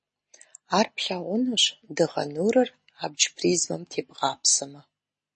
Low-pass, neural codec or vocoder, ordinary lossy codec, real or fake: 10.8 kHz; none; MP3, 32 kbps; real